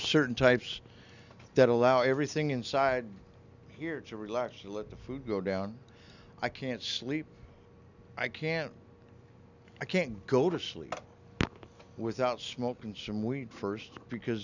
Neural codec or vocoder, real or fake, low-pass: none; real; 7.2 kHz